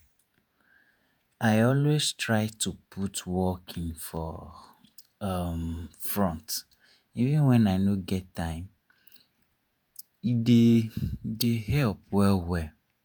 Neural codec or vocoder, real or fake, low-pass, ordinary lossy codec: vocoder, 48 kHz, 128 mel bands, Vocos; fake; none; none